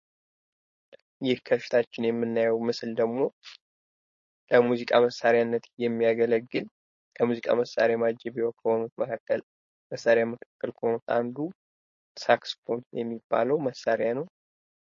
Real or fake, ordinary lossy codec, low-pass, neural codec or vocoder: fake; MP3, 32 kbps; 7.2 kHz; codec, 16 kHz, 4.8 kbps, FACodec